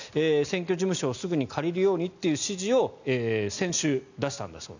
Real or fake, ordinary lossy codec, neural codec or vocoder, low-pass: real; none; none; 7.2 kHz